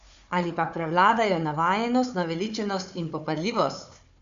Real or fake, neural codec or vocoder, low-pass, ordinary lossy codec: fake; codec, 16 kHz, 4 kbps, FunCodec, trained on Chinese and English, 50 frames a second; 7.2 kHz; MP3, 64 kbps